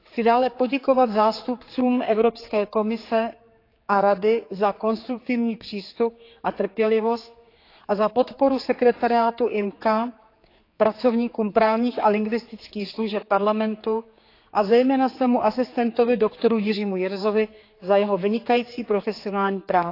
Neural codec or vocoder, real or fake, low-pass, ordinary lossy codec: codec, 16 kHz, 4 kbps, X-Codec, HuBERT features, trained on general audio; fake; 5.4 kHz; AAC, 32 kbps